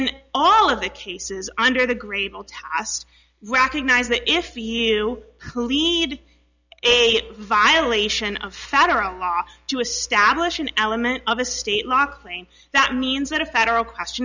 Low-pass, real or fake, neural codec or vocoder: 7.2 kHz; real; none